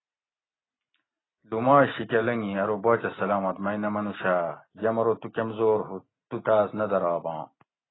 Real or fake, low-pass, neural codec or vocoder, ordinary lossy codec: real; 7.2 kHz; none; AAC, 16 kbps